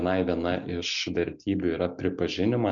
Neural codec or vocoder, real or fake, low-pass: none; real; 7.2 kHz